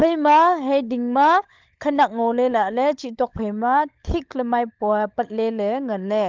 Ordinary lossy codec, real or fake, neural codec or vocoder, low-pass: Opus, 32 kbps; fake; codec, 16 kHz, 16 kbps, FunCodec, trained on LibriTTS, 50 frames a second; 7.2 kHz